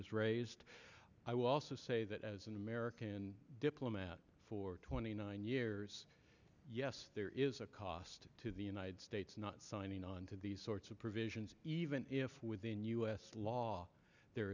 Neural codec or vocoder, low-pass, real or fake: none; 7.2 kHz; real